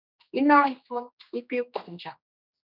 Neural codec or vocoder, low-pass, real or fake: codec, 16 kHz, 2 kbps, X-Codec, HuBERT features, trained on general audio; 5.4 kHz; fake